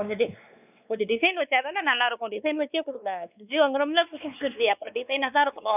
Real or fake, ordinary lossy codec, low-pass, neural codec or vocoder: fake; none; 3.6 kHz; codec, 16 kHz, 2 kbps, X-Codec, WavLM features, trained on Multilingual LibriSpeech